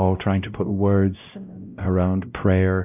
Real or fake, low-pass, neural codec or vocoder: fake; 3.6 kHz; codec, 16 kHz, 0.5 kbps, X-Codec, WavLM features, trained on Multilingual LibriSpeech